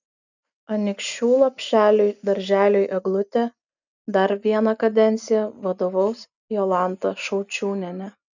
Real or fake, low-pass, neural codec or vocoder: real; 7.2 kHz; none